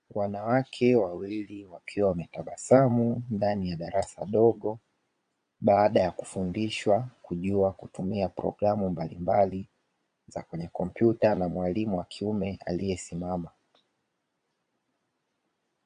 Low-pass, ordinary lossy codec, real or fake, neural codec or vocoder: 9.9 kHz; AAC, 64 kbps; fake; vocoder, 22.05 kHz, 80 mel bands, Vocos